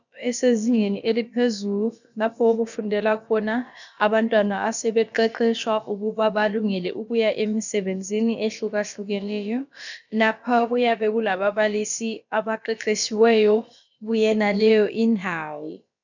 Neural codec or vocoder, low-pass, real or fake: codec, 16 kHz, about 1 kbps, DyCAST, with the encoder's durations; 7.2 kHz; fake